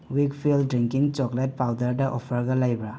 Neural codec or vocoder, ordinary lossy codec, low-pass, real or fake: none; none; none; real